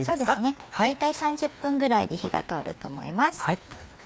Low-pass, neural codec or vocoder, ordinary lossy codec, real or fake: none; codec, 16 kHz, 2 kbps, FreqCodec, larger model; none; fake